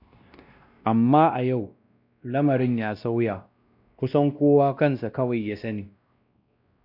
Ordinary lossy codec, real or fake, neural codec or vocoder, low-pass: AAC, 48 kbps; fake; codec, 16 kHz, 1 kbps, X-Codec, WavLM features, trained on Multilingual LibriSpeech; 5.4 kHz